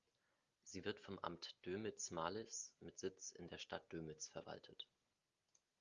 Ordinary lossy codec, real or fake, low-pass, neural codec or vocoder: Opus, 32 kbps; real; 7.2 kHz; none